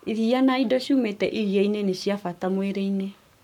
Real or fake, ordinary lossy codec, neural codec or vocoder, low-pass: fake; none; codec, 44.1 kHz, 7.8 kbps, Pupu-Codec; 19.8 kHz